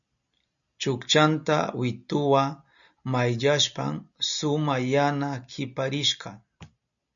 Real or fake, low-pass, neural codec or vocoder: real; 7.2 kHz; none